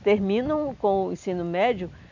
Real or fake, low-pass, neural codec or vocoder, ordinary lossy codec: real; 7.2 kHz; none; none